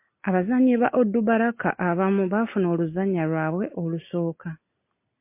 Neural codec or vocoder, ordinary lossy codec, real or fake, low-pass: none; MP3, 24 kbps; real; 3.6 kHz